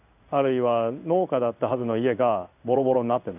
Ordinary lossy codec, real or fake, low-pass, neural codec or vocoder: none; fake; 3.6 kHz; codec, 16 kHz in and 24 kHz out, 1 kbps, XY-Tokenizer